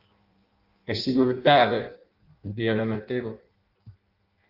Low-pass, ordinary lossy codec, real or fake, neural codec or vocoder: 5.4 kHz; Opus, 32 kbps; fake; codec, 16 kHz in and 24 kHz out, 0.6 kbps, FireRedTTS-2 codec